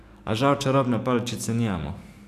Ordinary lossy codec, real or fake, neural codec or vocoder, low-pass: none; fake; codec, 44.1 kHz, 7.8 kbps, DAC; 14.4 kHz